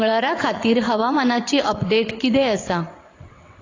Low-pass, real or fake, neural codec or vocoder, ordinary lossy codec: 7.2 kHz; fake; vocoder, 22.05 kHz, 80 mel bands, WaveNeXt; AAC, 32 kbps